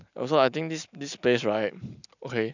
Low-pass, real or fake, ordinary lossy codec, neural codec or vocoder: 7.2 kHz; real; none; none